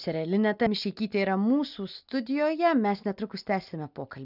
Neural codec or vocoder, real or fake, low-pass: none; real; 5.4 kHz